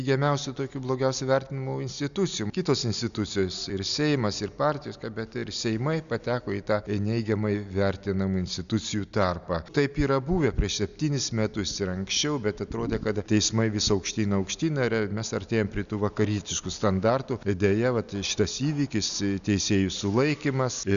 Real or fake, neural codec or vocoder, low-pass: real; none; 7.2 kHz